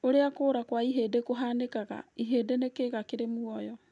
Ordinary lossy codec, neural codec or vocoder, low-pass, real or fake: none; none; 10.8 kHz; real